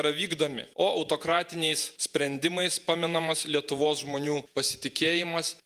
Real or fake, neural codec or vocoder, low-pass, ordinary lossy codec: fake; vocoder, 48 kHz, 128 mel bands, Vocos; 14.4 kHz; Opus, 32 kbps